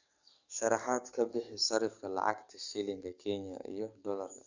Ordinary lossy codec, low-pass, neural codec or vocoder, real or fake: Opus, 64 kbps; 7.2 kHz; codec, 44.1 kHz, 7.8 kbps, DAC; fake